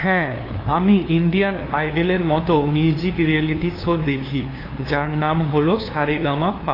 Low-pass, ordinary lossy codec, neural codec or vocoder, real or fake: 5.4 kHz; AAC, 24 kbps; codec, 16 kHz, 2 kbps, FunCodec, trained on LibriTTS, 25 frames a second; fake